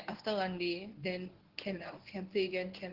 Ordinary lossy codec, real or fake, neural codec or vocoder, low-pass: Opus, 16 kbps; fake; codec, 24 kHz, 0.9 kbps, WavTokenizer, medium speech release version 1; 5.4 kHz